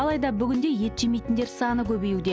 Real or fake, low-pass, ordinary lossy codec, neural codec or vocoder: real; none; none; none